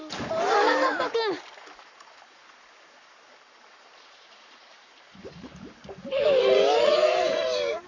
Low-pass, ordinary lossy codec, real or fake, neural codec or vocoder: 7.2 kHz; none; fake; codec, 44.1 kHz, 7.8 kbps, Pupu-Codec